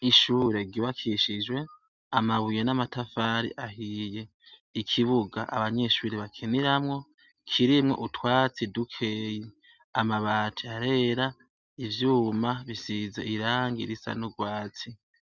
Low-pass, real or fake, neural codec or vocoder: 7.2 kHz; real; none